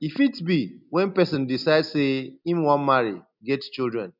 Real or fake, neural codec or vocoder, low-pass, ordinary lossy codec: real; none; 5.4 kHz; none